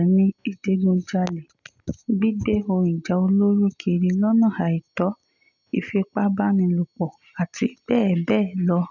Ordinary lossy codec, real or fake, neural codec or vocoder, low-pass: none; real; none; 7.2 kHz